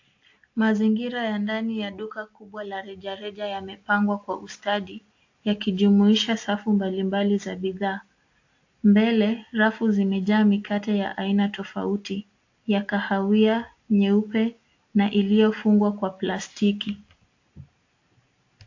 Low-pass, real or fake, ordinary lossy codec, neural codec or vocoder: 7.2 kHz; real; AAC, 48 kbps; none